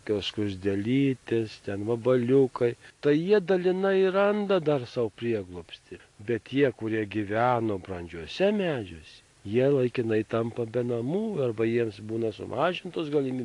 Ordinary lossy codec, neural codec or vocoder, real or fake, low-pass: AAC, 48 kbps; none; real; 10.8 kHz